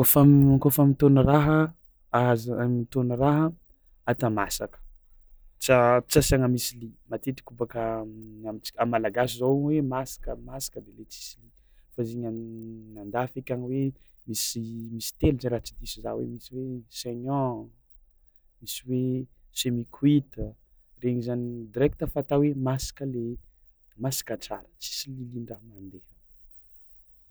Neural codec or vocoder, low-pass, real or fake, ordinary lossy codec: none; none; real; none